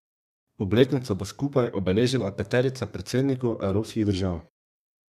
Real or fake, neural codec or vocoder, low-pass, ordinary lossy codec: fake; codec, 32 kHz, 1.9 kbps, SNAC; 14.4 kHz; none